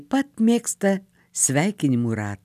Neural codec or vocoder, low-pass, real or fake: none; 14.4 kHz; real